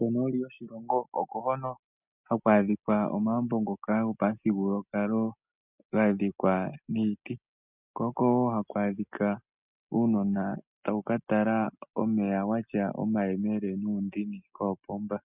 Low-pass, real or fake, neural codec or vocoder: 3.6 kHz; real; none